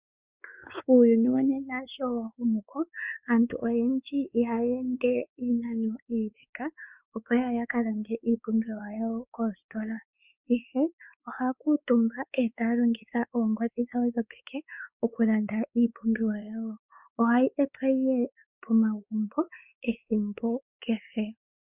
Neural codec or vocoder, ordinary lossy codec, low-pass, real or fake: codec, 16 kHz, 4 kbps, X-Codec, WavLM features, trained on Multilingual LibriSpeech; Opus, 64 kbps; 3.6 kHz; fake